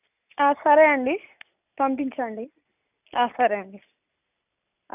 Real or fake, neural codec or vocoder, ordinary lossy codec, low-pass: real; none; none; 3.6 kHz